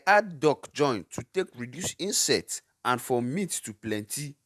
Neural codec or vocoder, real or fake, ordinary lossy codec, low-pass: vocoder, 48 kHz, 128 mel bands, Vocos; fake; none; 14.4 kHz